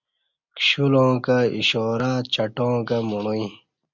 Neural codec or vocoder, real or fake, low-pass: none; real; 7.2 kHz